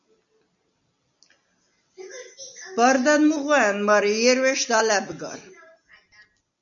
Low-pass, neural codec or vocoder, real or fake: 7.2 kHz; none; real